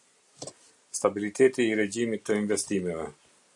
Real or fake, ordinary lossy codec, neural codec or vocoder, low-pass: real; MP3, 96 kbps; none; 10.8 kHz